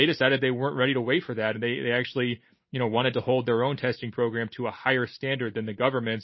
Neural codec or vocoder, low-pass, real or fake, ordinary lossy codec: none; 7.2 kHz; real; MP3, 24 kbps